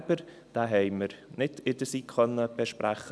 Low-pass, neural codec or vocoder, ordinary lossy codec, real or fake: none; none; none; real